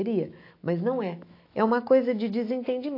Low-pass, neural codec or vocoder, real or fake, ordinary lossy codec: 5.4 kHz; autoencoder, 48 kHz, 128 numbers a frame, DAC-VAE, trained on Japanese speech; fake; AAC, 32 kbps